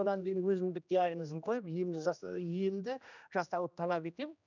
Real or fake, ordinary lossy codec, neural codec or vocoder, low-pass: fake; none; codec, 16 kHz, 1 kbps, X-Codec, HuBERT features, trained on general audio; 7.2 kHz